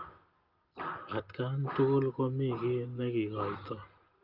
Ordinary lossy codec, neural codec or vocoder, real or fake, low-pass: Opus, 32 kbps; none; real; 5.4 kHz